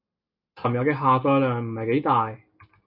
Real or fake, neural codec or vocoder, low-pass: real; none; 5.4 kHz